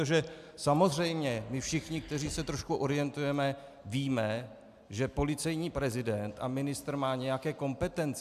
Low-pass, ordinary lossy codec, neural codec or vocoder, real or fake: 14.4 kHz; AAC, 96 kbps; none; real